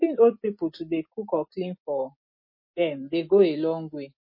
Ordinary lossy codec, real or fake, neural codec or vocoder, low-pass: MP3, 24 kbps; real; none; 5.4 kHz